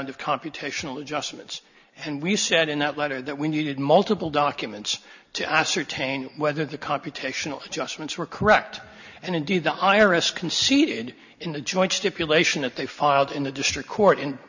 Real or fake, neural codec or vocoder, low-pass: real; none; 7.2 kHz